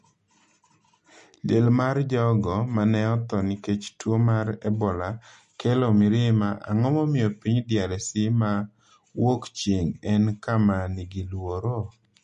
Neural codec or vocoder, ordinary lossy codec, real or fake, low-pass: none; MP3, 48 kbps; real; 10.8 kHz